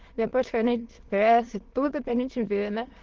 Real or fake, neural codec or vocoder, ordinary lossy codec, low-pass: fake; autoencoder, 22.05 kHz, a latent of 192 numbers a frame, VITS, trained on many speakers; Opus, 16 kbps; 7.2 kHz